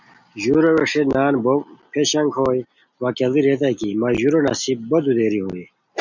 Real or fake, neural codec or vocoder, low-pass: real; none; 7.2 kHz